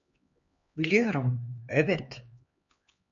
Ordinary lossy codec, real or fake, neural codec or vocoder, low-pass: MP3, 48 kbps; fake; codec, 16 kHz, 2 kbps, X-Codec, HuBERT features, trained on LibriSpeech; 7.2 kHz